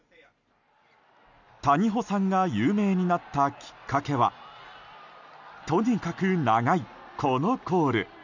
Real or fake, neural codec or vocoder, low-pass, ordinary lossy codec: real; none; 7.2 kHz; none